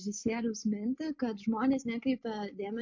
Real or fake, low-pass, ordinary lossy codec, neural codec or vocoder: real; 7.2 kHz; MP3, 64 kbps; none